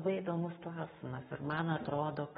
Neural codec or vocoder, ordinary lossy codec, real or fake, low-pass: codec, 44.1 kHz, 7.8 kbps, Pupu-Codec; AAC, 16 kbps; fake; 19.8 kHz